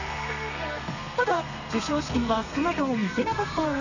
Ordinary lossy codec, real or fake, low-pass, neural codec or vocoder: none; fake; 7.2 kHz; codec, 32 kHz, 1.9 kbps, SNAC